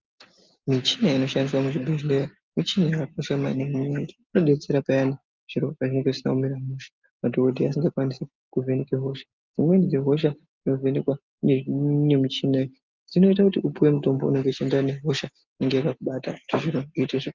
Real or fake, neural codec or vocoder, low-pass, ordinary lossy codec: real; none; 7.2 kHz; Opus, 24 kbps